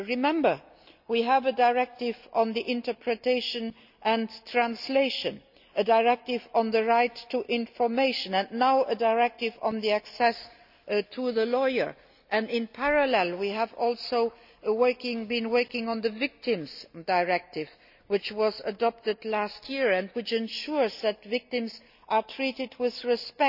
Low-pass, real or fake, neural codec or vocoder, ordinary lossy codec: 5.4 kHz; real; none; none